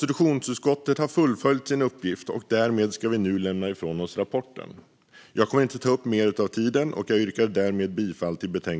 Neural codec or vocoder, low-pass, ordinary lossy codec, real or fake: none; none; none; real